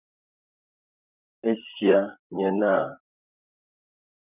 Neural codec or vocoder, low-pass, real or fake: vocoder, 44.1 kHz, 128 mel bands, Pupu-Vocoder; 3.6 kHz; fake